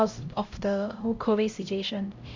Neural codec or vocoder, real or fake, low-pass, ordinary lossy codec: codec, 16 kHz, 0.5 kbps, X-Codec, HuBERT features, trained on LibriSpeech; fake; 7.2 kHz; MP3, 64 kbps